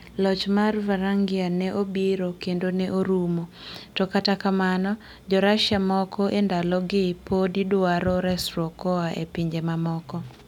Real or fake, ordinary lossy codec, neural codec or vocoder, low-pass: real; none; none; 19.8 kHz